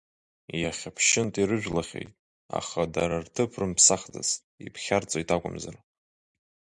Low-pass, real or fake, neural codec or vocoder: 10.8 kHz; real; none